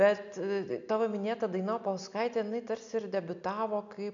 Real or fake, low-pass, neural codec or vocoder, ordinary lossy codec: real; 7.2 kHz; none; MP3, 96 kbps